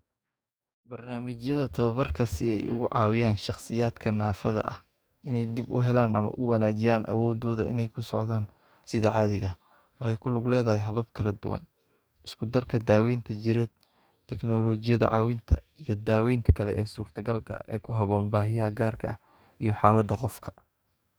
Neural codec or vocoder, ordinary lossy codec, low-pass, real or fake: codec, 44.1 kHz, 2.6 kbps, DAC; none; none; fake